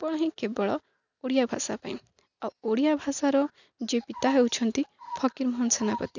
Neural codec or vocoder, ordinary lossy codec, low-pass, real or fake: none; none; 7.2 kHz; real